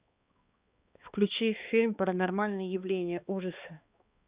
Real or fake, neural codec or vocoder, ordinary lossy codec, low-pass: fake; codec, 16 kHz, 2 kbps, X-Codec, HuBERT features, trained on balanced general audio; Opus, 64 kbps; 3.6 kHz